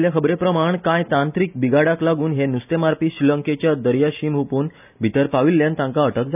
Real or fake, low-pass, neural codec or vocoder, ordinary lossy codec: real; 3.6 kHz; none; none